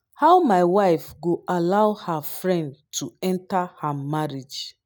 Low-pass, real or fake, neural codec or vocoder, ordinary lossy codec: none; real; none; none